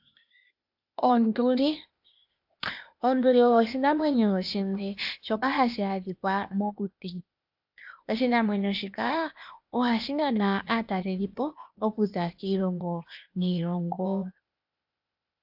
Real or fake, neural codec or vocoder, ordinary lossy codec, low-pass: fake; codec, 16 kHz, 0.8 kbps, ZipCodec; AAC, 48 kbps; 5.4 kHz